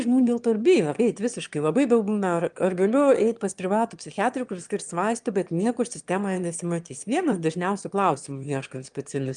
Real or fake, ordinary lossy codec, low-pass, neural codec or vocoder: fake; Opus, 32 kbps; 9.9 kHz; autoencoder, 22.05 kHz, a latent of 192 numbers a frame, VITS, trained on one speaker